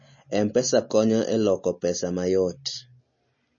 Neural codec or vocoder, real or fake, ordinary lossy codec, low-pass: none; real; MP3, 32 kbps; 7.2 kHz